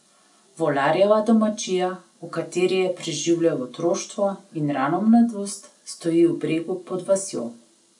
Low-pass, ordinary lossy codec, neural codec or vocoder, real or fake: 10.8 kHz; none; none; real